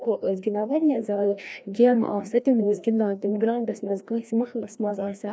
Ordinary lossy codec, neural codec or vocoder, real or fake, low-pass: none; codec, 16 kHz, 1 kbps, FreqCodec, larger model; fake; none